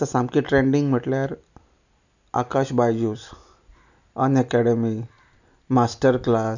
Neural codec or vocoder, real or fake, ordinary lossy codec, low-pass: none; real; none; 7.2 kHz